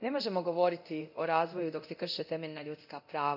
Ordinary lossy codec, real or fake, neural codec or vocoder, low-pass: none; fake; codec, 24 kHz, 0.9 kbps, DualCodec; 5.4 kHz